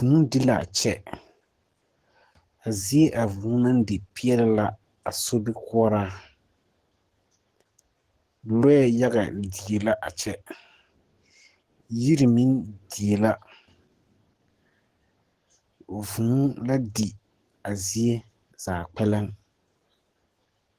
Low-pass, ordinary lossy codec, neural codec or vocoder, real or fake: 14.4 kHz; Opus, 16 kbps; codec, 44.1 kHz, 7.8 kbps, DAC; fake